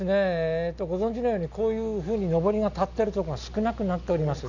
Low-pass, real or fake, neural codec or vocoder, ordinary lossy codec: 7.2 kHz; real; none; none